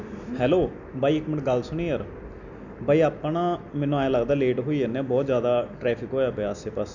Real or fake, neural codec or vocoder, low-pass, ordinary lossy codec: real; none; 7.2 kHz; none